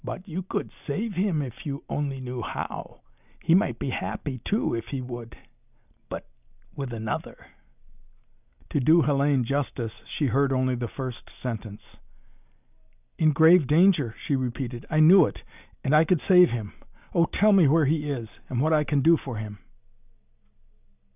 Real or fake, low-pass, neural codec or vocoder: real; 3.6 kHz; none